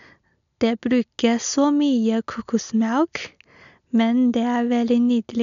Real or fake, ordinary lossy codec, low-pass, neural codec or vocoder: real; none; 7.2 kHz; none